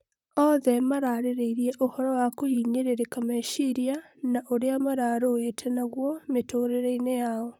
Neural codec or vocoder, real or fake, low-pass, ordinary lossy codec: vocoder, 44.1 kHz, 128 mel bands, Pupu-Vocoder; fake; 19.8 kHz; none